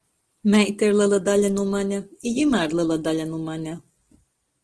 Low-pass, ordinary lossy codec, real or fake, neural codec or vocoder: 10.8 kHz; Opus, 16 kbps; real; none